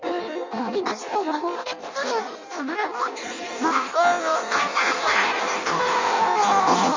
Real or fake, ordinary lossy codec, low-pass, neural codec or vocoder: fake; MP3, 64 kbps; 7.2 kHz; codec, 16 kHz in and 24 kHz out, 0.6 kbps, FireRedTTS-2 codec